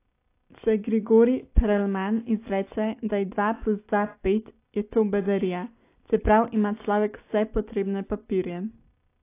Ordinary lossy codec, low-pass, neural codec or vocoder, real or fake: AAC, 24 kbps; 3.6 kHz; none; real